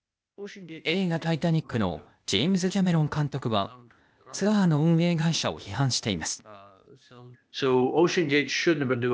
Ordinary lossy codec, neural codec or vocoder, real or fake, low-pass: none; codec, 16 kHz, 0.8 kbps, ZipCodec; fake; none